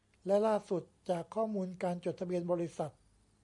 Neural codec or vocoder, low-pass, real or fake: none; 10.8 kHz; real